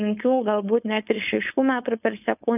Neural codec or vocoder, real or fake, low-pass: codec, 16 kHz, 4.8 kbps, FACodec; fake; 3.6 kHz